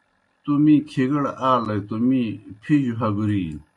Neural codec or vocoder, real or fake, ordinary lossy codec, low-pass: none; real; AAC, 48 kbps; 10.8 kHz